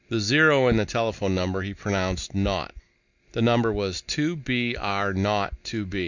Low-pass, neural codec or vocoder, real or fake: 7.2 kHz; none; real